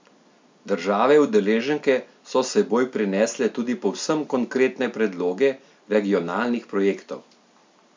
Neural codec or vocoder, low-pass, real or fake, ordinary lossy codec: none; 7.2 kHz; real; MP3, 64 kbps